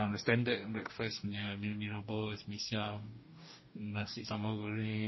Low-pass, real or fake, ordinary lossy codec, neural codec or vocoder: 7.2 kHz; fake; MP3, 24 kbps; codec, 44.1 kHz, 2.6 kbps, DAC